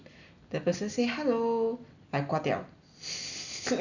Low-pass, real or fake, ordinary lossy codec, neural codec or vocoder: 7.2 kHz; real; none; none